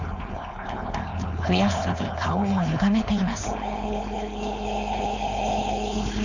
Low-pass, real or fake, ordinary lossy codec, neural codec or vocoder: 7.2 kHz; fake; none; codec, 16 kHz, 4.8 kbps, FACodec